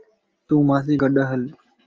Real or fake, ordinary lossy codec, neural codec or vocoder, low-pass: real; Opus, 24 kbps; none; 7.2 kHz